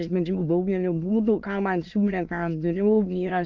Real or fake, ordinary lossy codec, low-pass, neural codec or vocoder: fake; Opus, 24 kbps; 7.2 kHz; autoencoder, 22.05 kHz, a latent of 192 numbers a frame, VITS, trained on many speakers